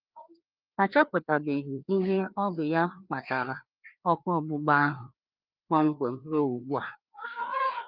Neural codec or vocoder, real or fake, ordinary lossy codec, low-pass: codec, 16 kHz, 2 kbps, FreqCodec, larger model; fake; Opus, 24 kbps; 5.4 kHz